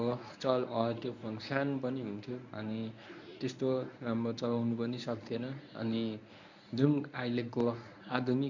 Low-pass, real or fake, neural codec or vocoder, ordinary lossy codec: 7.2 kHz; fake; codec, 24 kHz, 0.9 kbps, WavTokenizer, medium speech release version 1; none